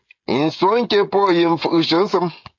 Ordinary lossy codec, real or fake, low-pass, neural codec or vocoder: AAC, 48 kbps; fake; 7.2 kHz; codec, 16 kHz, 16 kbps, FreqCodec, smaller model